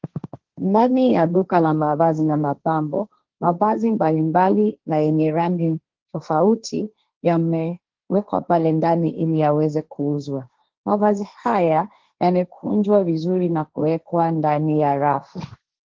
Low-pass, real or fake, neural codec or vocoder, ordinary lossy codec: 7.2 kHz; fake; codec, 16 kHz, 1.1 kbps, Voila-Tokenizer; Opus, 16 kbps